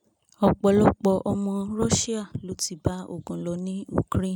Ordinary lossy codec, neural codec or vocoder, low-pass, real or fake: none; none; 19.8 kHz; real